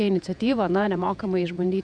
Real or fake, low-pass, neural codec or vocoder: fake; 9.9 kHz; vocoder, 48 kHz, 128 mel bands, Vocos